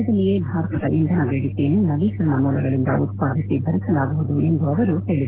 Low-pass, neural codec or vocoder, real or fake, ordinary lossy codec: 3.6 kHz; none; real; Opus, 16 kbps